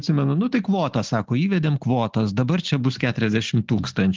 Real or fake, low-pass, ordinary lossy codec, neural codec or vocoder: real; 7.2 kHz; Opus, 16 kbps; none